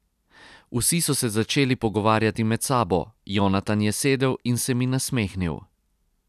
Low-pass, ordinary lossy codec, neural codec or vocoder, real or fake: 14.4 kHz; none; none; real